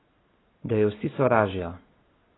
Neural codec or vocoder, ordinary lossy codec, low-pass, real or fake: none; AAC, 16 kbps; 7.2 kHz; real